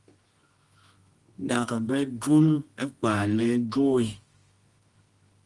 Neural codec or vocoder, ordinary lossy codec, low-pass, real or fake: codec, 24 kHz, 0.9 kbps, WavTokenizer, medium music audio release; Opus, 24 kbps; 10.8 kHz; fake